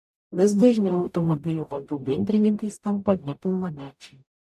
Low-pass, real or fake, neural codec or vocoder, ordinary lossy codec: 14.4 kHz; fake; codec, 44.1 kHz, 0.9 kbps, DAC; MP3, 96 kbps